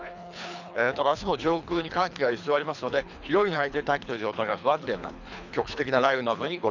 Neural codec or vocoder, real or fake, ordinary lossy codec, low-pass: codec, 24 kHz, 3 kbps, HILCodec; fake; none; 7.2 kHz